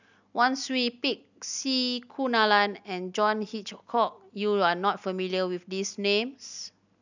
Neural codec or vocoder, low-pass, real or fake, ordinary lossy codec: none; 7.2 kHz; real; none